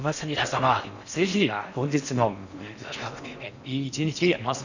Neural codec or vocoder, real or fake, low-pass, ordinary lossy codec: codec, 16 kHz in and 24 kHz out, 0.6 kbps, FocalCodec, streaming, 4096 codes; fake; 7.2 kHz; none